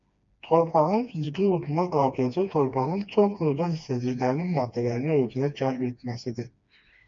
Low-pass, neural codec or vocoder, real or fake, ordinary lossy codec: 7.2 kHz; codec, 16 kHz, 2 kbps, FreqCodec, smaller model; fake; MP3, 48 kbps